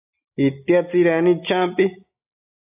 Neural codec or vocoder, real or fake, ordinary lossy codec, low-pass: none; real; AAC, 32 kbps; 3.6 kHz